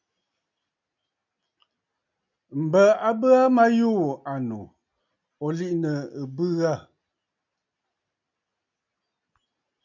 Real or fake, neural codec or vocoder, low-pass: real; none; 7.2 kHz